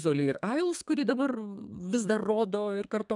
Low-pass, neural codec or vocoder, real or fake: 10.8 kHz; codec, 32 kHz, 1.9 kbps, SNAC; fake